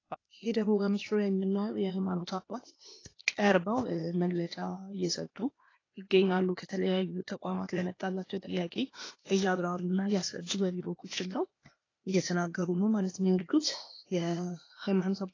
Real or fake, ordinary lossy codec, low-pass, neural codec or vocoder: fake; AAC, 32 kbps; 7.2 kHz; codec, 16 kHz, 0.8 kbps, ZipCodec